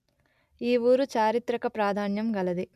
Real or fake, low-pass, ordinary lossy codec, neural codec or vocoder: real; 14.4 kHz; none; none